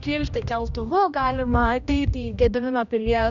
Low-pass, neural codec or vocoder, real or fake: 7.2 kHz; codec, 16 kHz, 1 kbps, X-Codec, HuBERT features, trained on general audio; fake